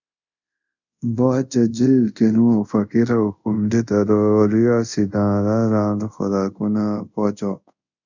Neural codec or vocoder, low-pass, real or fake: codec, 24 kHz, 0.5 kbps, DualCodec; 7.2 kHz; fake